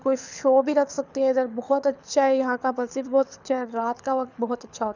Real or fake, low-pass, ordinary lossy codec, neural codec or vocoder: fake; 7.2 kHz; none; codec, 24 kHz, 6 kbps, HILCodec